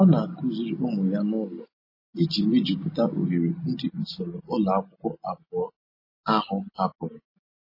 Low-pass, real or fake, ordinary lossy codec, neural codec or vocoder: 5.4 kHz; real; MP3, 24 kbps; none